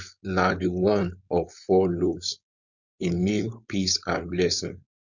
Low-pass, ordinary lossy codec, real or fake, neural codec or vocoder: 7.2 kHz; none; fake; codec, 16 kHz, 4.8 kbps, FACodec